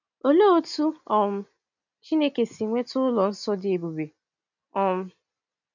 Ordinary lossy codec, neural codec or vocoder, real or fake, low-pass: none; none; real; 7.2 kHz